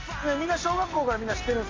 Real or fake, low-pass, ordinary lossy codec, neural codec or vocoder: real; 7.2 kHz; MP3, 64 kbps; none